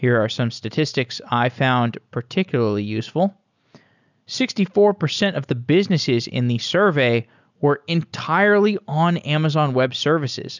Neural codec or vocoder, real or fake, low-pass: none; real; 7.2 kHz